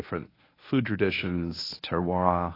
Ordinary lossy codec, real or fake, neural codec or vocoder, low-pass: AAC, 24 kbps; fake; codec, 24 kHz, 0.9 kbps, WavTokenizer, medium speech release version 1; 5.4 kHz